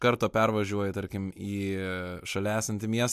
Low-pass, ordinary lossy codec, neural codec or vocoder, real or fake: 14.4 kHz; MP3, 96 kbps; none; real